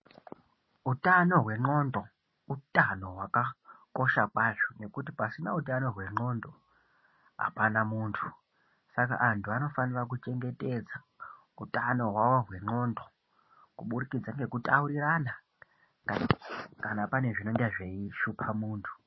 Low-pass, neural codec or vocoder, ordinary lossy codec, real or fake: 7.2 kHz; none; MP3, 24 kbps; real